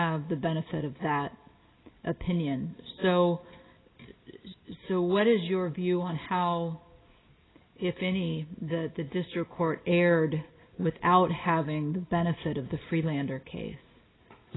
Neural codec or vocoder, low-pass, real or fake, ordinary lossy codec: none; 7.2 kHz; real; AAC, 16 kbps